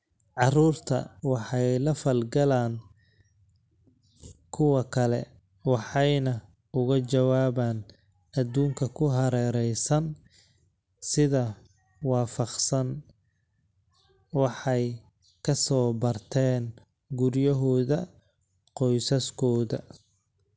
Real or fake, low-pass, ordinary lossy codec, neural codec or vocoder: real; none; none; none